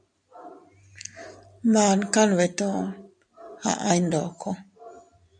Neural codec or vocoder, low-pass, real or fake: none; 9.9 kHz; real